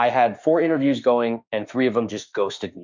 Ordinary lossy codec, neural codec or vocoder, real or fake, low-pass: MP3, 64 kbps; autoencoder, 48 kHz, 32 numbers a frame, DAC-VAE, trained on Japanese speech; fake; 7.2 kHz